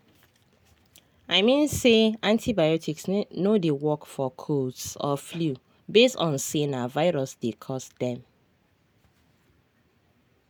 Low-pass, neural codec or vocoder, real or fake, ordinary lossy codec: none; none; real; none